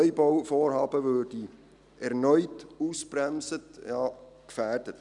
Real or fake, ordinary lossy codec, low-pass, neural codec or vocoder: real; none; 10.8 kHz; none